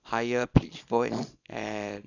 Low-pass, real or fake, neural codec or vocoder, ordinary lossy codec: 7.2 kHz; fake; codec, 24 kHz, 0.9 kbps, WavTokenizer, small release; none